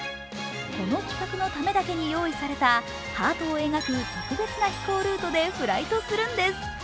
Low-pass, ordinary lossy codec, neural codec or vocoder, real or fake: none; none; none; real